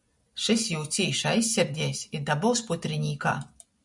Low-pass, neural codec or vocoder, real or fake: 10.8 kHz; none; real